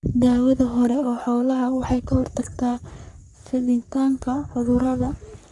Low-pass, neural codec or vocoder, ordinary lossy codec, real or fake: 10.8 kHz; codec, 44.1 kHz, 3.4 kbps, Pupu-Codec; none; fake